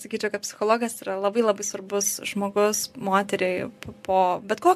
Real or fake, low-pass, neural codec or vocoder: real; 14.4 kHz; none